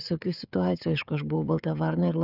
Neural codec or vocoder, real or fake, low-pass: codec, 16 kHz, 16 kbps, FreqCodec, smaller model; fake; 5.4 kHz